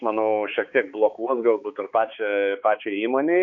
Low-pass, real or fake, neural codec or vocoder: 7.2 kHz; fake; codec, 16 kHz, 4 kbps, X-Codec, HuBERT features, trained on balanced general audio